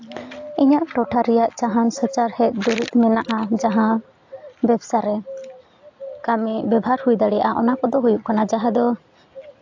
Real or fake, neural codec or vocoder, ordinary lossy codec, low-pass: real; none; AAC, 48 kbps; 7.2 kHz